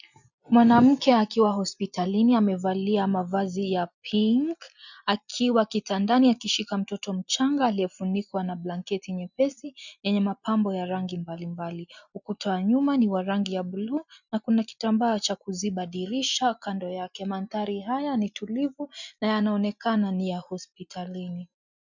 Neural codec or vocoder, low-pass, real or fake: none; 7.2 kHz; real